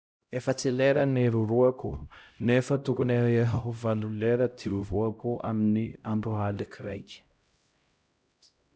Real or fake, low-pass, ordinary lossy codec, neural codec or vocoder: fake; none; none; codec, 16 kHz, 0.5 kbps, X-Codec, HuBERT features, trained on LibriSpeech